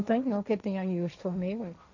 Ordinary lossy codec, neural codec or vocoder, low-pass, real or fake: none; codec, 16 kHz, 1.1 kbps, Voila-Tokenizer; 7.2 kHz; fake